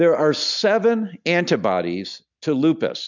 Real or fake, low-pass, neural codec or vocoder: real; 7.2 kHz; none